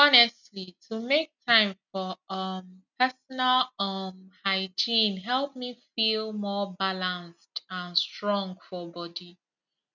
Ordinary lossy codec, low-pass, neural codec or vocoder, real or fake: none; 7.2 kHz; none; real